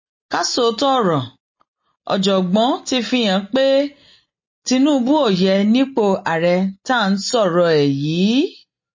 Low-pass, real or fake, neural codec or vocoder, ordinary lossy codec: 7.2 kHz; real; none; MP3, 32 kbps